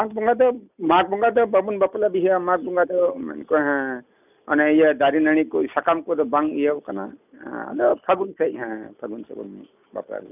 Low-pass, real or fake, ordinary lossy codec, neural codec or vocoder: 3.6 kHz; real; none; none